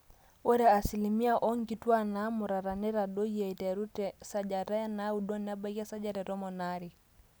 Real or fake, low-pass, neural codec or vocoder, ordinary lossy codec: real; none; none; none